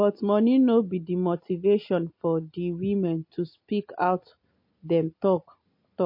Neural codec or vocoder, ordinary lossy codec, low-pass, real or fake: none; MP3, 32 kbps; 5.4 kHz; real